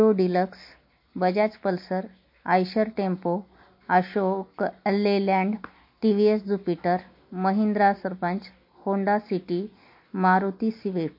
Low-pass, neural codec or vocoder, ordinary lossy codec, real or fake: 5.4 kHz; none; MP3, 32 kbps; real